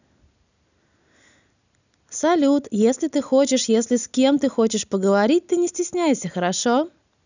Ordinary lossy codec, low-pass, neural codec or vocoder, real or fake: none; 7.2 kHz; none; real